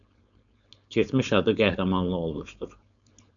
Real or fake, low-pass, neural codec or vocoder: fake; 7.2 kHz; codec, 16 kHz, 4.8 kbps, FACodec